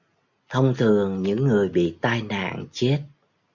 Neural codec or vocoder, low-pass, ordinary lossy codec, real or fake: none; 7.2 kHz; MP3, 64 kbps; real